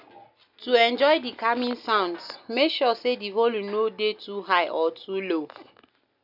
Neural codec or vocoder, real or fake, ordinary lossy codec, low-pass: none; real; none; 5.4 kHz